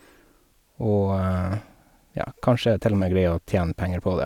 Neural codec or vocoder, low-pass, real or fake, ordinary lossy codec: none; 19.8 kHz; real; none